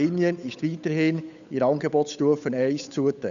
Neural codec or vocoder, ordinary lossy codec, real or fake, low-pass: codec, 16 kHz, 8 kbps, FunCodec, trained on Chinese and English, 25 frames a second; none; fake; 7.2 kHz